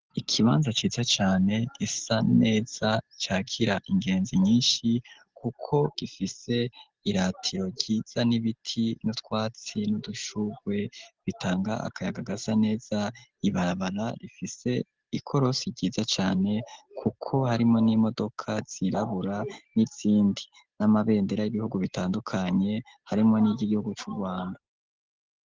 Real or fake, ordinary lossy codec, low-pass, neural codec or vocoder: real; Opus, 16 kbps; 7.2 kHz; none